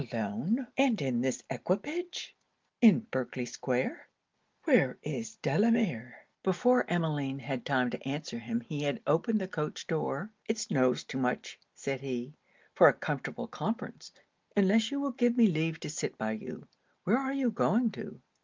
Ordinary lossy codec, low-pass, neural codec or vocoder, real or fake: Opus, 32 kbps; 7.2 kHz; none; real